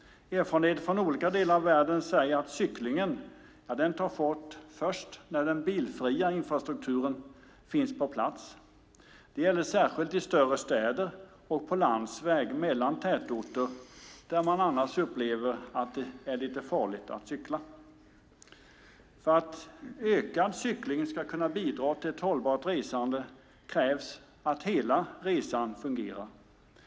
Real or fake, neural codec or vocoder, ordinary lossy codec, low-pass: real; none; none; none